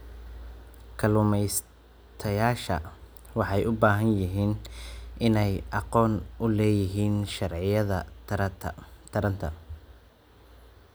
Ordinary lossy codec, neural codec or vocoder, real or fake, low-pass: none; none; real; none